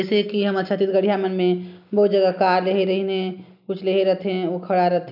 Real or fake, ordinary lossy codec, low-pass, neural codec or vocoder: real; none; 5.4 kHz; none